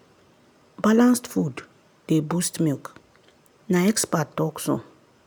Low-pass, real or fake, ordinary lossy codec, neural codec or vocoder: none; real; none; none